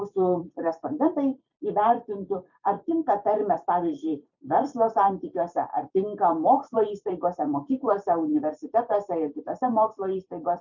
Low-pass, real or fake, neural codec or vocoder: 7.2 kHz; fake; vocoder, 44.1 kHz, 128 mel bands every 256 samples, BigVGAN v2